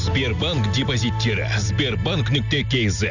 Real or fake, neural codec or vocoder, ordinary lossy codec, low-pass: real; none; none; 7.2 kHz